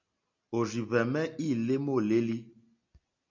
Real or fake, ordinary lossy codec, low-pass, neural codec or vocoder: real; MP3, 64 kbps; 7.2 kHz; none